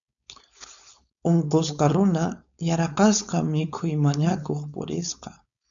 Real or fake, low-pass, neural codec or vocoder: fake; 7.2 kHz; codec, 16 kHz, 4.8 kbps, FACodec